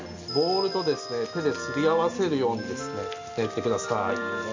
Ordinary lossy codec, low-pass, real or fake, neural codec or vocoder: MP3, 64 kbps; 7.2 kHz; real; none